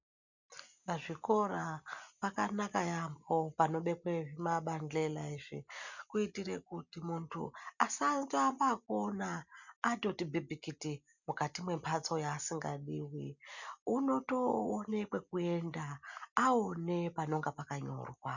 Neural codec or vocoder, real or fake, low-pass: none; real; 7.2 kHz